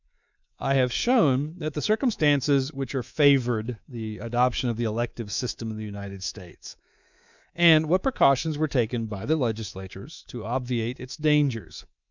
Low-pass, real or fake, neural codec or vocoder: 7.2 kHz; fake; codec, 24 kHz, 3.1 kbps, DualCodec